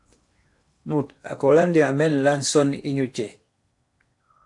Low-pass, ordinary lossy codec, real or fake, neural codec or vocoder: 10.8 kHz; AAC, 64 kbps; fake; codec, 16 kHz in and 24 kHz out, 0.8 kbps, FocalCodec, streaming, 65536 codes